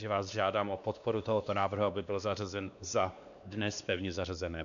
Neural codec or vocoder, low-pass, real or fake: codec, 16 kHz, 2 kbps, X-Codec, WavLM features, trained on Multilingual LibriSpeech; 7.2 kHz; fake